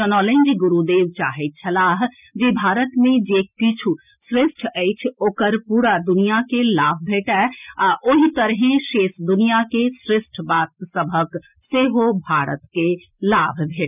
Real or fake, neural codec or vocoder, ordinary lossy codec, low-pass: real; none; none; 3.6 kHz